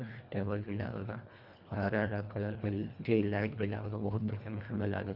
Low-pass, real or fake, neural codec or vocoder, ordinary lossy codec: 5.4 kHz; fake; codec, 24 kHz, 1.5 kbps, HILCodec; Opus, 64 kbps